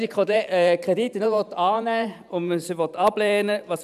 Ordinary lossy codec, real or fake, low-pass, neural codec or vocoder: none; fake; 14.4 kHz; vocoder, 44.1 kHz, 128 mel bands every 512 samples, BigVGAN v2